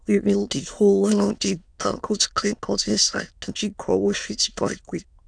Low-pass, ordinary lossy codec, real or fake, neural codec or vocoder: 9.9 kHz; none; fake; autoencoder, 22.05 kHz, a latent of 192 numbers a frame, VITS, trained on many speakers